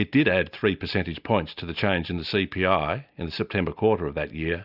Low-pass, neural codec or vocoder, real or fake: 5.4 kHz; none; real